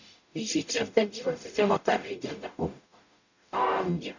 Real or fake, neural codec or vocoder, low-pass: fake; codec, 44.1 kHz, 0.9 kbps, DAC; 7.2 kHz